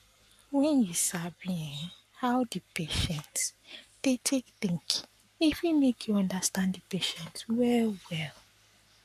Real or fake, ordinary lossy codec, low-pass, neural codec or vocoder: fake; none; 14.4 kHz; codec, 44.1 kHz, 7.8 kbps, Pupu-Codec